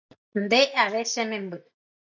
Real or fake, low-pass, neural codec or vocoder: real; 7.2 kHz; none